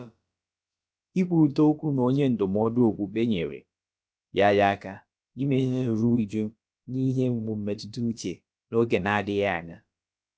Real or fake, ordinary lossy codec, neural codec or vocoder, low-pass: fake; none; codec, 16 kHz, about 1 kbps, DyCAST, with the encoder's durations; none